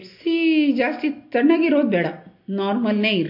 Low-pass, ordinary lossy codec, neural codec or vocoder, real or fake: 5.4 kHz; MP3, 32 kbps; none; real